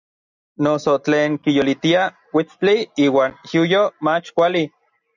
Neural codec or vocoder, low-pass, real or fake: none; 7.2 kHz; real